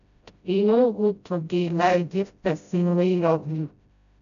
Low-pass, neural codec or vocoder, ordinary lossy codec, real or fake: 7.2 kHz; codec, 16 kHz, 0.5 kbps, FreqCodec, smaller model; none; fake